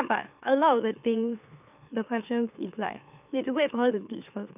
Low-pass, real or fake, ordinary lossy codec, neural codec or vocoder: 3.6 kHz; fake; none; autoencoder, 44.1 kHz, a latent of 192 numbers a frame, MeloTTS